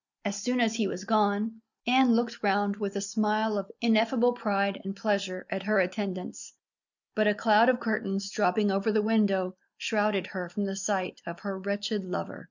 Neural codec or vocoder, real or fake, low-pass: none; real; 7.2 kHz